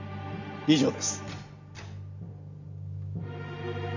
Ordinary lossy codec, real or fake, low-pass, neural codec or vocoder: none; real; 7.2 kHz; none